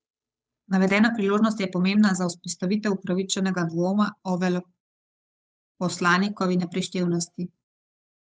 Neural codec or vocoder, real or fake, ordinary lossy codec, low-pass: codec, 16 kHz, 8 kbps, FunCodec, trained on Chinese and English, 25 frames a second; fake; none; none